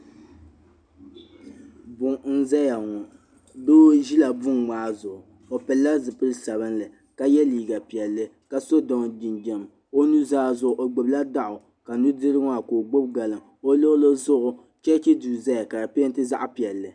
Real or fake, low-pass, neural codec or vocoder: real; 9.9 kHz; none